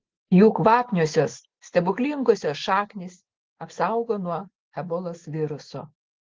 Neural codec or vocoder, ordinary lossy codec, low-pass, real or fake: none; Opus, 16 kbps; 7.2 kHz; real